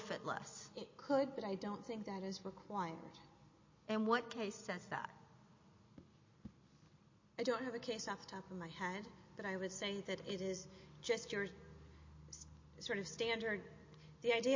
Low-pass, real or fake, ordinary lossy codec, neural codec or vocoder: 7.2 kHz; real; MP3, 32 kbps; none